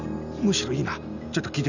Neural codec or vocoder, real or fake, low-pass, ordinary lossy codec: none; real; 7.2 kHz; none